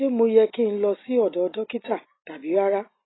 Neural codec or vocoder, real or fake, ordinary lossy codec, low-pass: none; real; AAC, 16 kbps; 7.2 kHz